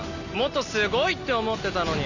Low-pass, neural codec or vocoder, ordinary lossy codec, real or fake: 7.2 kHz; none; none; real